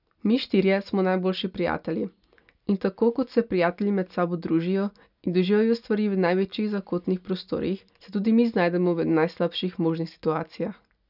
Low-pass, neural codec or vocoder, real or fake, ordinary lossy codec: 5.4 kHz; none; real; none